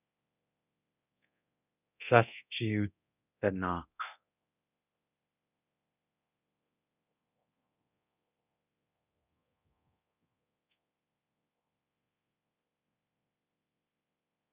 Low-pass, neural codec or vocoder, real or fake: 3.6 kHz; codec, 24 kHz, 0.9 kbps, DualCodec; fake